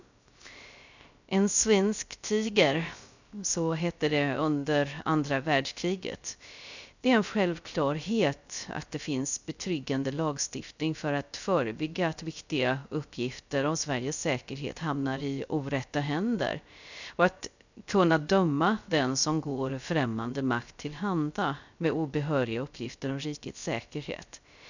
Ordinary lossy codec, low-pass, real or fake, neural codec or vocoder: none; 7.2 kHz; fake; codec, 16 kHz, 0.3 kbps, FocalCodec